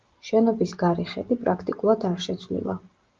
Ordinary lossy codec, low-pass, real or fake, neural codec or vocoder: Opus, 32 kbps; 7.2 kHz; real; none